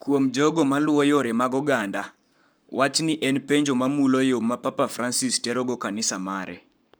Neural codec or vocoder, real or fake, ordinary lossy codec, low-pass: codec, 44.1 kHz, 7.8 kbps, Pupu-Codec; fake; none; none